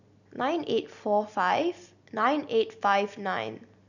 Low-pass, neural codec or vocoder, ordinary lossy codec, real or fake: 7.2 kHz; none; none; real